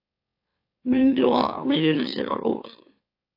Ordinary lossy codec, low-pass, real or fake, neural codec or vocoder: AAC, 32 kbps; 5.4 kHz; fake; autoencoder, 44.1 kHz, a latent of 192 numbers a frame, MeloTTS